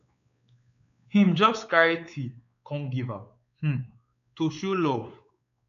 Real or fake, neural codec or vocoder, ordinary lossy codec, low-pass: fake; codec, 16 kHz, 4 kbps, X-Codec, WavLM features, trained on Multilingual LibriSpeech; none; 7.2 kHz